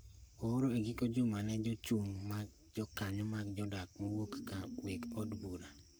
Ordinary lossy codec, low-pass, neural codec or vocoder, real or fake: none; none; codec, 44.1 kHz, 7.8 kbps, Pupu-Codec; fake